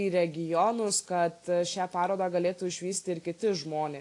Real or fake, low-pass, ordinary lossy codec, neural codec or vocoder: real; 10.8 kHz; AAC, 48 kbps; none